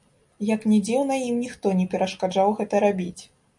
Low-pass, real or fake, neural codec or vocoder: 10.8 kHz; real; none